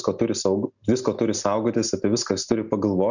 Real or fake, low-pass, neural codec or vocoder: real; 7.2 kHz; none